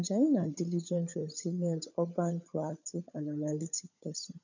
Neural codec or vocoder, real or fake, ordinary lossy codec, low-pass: codec, 16 kHz, 16 kbps, FunCodec, trained on LibriTTS, 50 frames a second; fake; none; 7.2 kHz